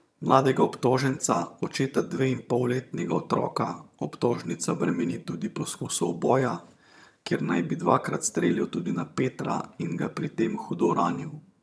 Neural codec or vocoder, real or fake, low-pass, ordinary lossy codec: vocoder, 22.05 kHz, 80 mel bands, HiFi-GAN; fake; none; none